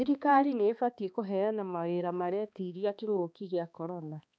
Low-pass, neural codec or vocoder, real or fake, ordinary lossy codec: none; codec, 16 kHz, 2 kbps, X-Codec, HuBERT features, trained on balanced general audio; fake; none